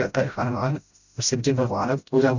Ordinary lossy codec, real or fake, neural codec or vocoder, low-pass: none; fake; codec, 16 kHz, 0.5 kbps, FreqCodec, smaller model; 7.2 kHz